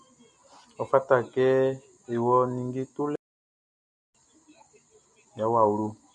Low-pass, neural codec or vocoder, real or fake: 10.8 kHz; none; real